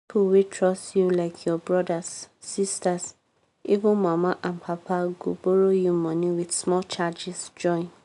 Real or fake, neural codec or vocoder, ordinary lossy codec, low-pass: real; none; none; 10.8 kHz